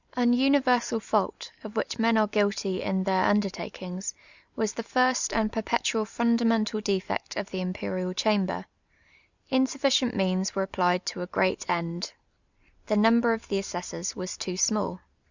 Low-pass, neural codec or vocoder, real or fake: 7.2 kHz; none; real